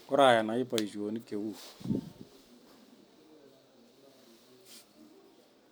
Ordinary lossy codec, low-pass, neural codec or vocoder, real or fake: none; none; none; real